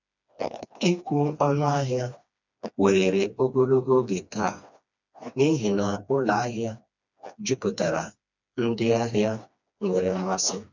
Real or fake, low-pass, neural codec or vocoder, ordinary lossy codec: fake; 7.2 kHz; codec, 16 kHz, 2 kbps, FreqCodec, smaller model; none